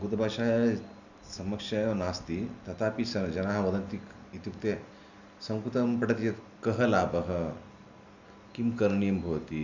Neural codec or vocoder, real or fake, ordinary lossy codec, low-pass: none; real; none; 7.2 kHz